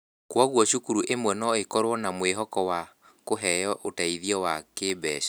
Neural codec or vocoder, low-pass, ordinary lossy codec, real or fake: none; none; none; real